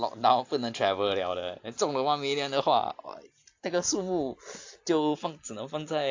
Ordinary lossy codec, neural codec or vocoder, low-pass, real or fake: AAC, 48 kbps; none; 7.2 kHz; real